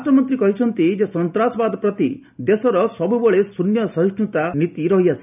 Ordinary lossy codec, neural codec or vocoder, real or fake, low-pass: none; none; real; 3.6 kHz